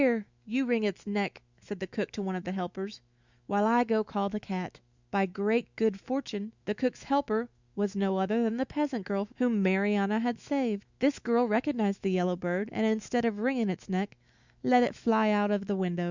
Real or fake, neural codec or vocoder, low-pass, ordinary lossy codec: fake; autoencoder, 48 kHz, 128 numbers a frame, DAC-VAE, trained on Japanese speech; 7.2 kHz; Opus, 64 kbps